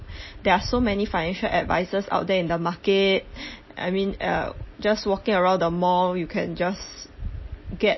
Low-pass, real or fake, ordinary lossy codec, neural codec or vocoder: 7.2 kHz; real; MP3, 24 kbps; none